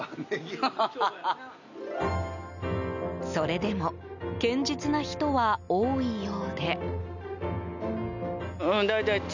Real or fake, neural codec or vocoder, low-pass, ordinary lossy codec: real; none; 7.2 kHz; none